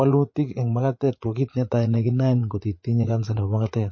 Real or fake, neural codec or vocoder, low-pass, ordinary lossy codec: fake; vocoder, 44.1 kHz, 80 mel bands, Vocos; 7.2 kHz; MP3, 32 kbps